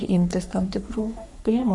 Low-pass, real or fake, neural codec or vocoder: 10.8 kHz; fake; codec, 44.1 kHz, 3.4 kbps, Pupu-Codec